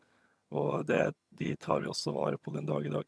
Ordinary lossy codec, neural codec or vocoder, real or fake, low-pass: none; vocoder, 22.05 kHz, 80 mel bands, HiFi-GAN; fake; none